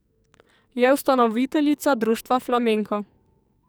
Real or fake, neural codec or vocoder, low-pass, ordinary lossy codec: fake; codec, 44.1 kHz, 2.6 kbps, SNAC; none; none